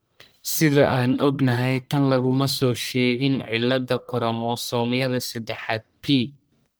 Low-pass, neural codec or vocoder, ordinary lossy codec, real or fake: none; codec, 44.1 kHz, 1.7 kbps, Pupu-Codec; none; fake